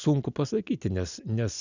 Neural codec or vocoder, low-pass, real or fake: none; 7.2 kHz; real